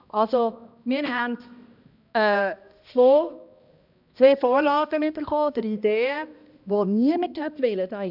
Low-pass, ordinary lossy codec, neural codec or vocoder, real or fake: 5.4 kHz; none; codec, 16 kHz, 1 kbps, X-Codec, HuBERT features, trained on balanced general audio; fake